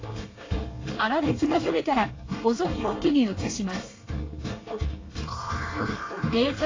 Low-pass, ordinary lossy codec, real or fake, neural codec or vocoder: 7.2 kHz; AAC, 32 kbps; fake; codec, 24 kHz, 1 kbps, SNAC